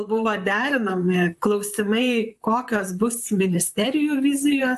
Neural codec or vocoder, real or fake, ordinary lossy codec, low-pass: vocoder, 44.1 kHz, 128 mel bands, Pupu-Vocoder; fake; AAC, 96 kbps; 14.4 kHz